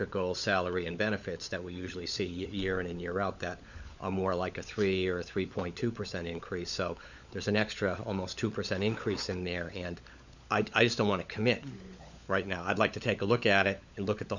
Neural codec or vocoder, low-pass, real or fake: codec, 16 kHz, 16 kbps, FunCodec, trained on LibriTTS, 50 frames a second; 7.2 kHz; fake